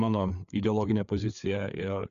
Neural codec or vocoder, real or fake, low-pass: codec, 16 kHz, 4 kbps, FreqCodec, larger model; fake; 7.2 kHz